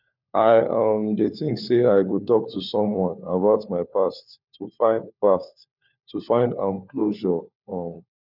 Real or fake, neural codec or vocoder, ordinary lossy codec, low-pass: fake; codec, 16 kHz, 4 kbps, FunCodec, trained on LibriTTS, 50 frames a second; none; 5.4 kHz